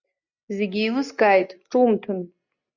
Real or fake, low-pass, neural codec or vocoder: real; 7.2 kHz; none